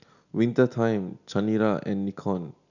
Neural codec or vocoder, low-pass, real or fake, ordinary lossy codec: none; 7.2 kHz; real; none